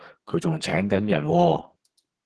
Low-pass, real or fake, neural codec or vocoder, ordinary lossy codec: 10.8 kHz; fake; codec, 32 kHz, 1.9 kbps, SNAC; Opus, 16 kbps